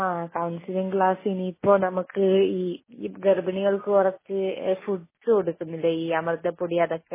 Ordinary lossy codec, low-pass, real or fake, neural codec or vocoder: MP3, 16 kbps; 3.6 kHz; real; none